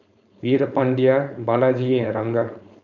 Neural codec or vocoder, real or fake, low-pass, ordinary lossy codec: codec, 16 kHz, 4.8 kbps, FACodec; fake; 7.2 kHz; none